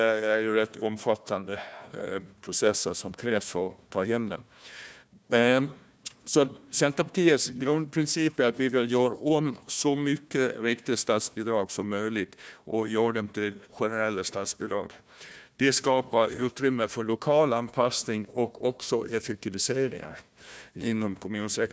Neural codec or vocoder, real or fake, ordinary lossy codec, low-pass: codec, 16 kHz, 1 kbps, FunCodec, trained on Chinese and English, 50 frames a second; fake; none; none